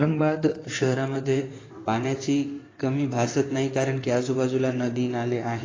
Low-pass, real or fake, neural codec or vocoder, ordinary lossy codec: 7.2 kHz; fake; codec, 16 kHz in and 24 kHz out, 2.2 kbps, FireRedTTS-2 codec; AAC, 32 kbps